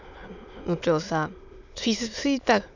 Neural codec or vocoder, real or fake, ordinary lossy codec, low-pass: autoencoder, 22.05 kHz, a latent of 192 numbers a frame, VITS, trained on many speakers; fake; none; 7.2 kHz